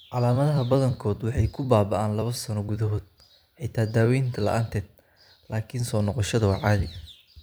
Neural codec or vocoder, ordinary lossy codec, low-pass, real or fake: vocoder, 44.1 kHz, 128 mel bands every 256 samples, BigVGAN v2; none; none; fake